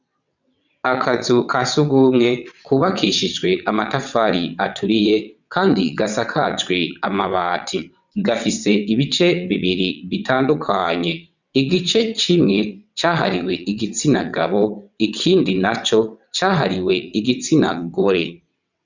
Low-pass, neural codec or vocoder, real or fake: 7.2 kHz; vocoder, 22.05 kHz, 80 mel bands, WaveNeXt; fake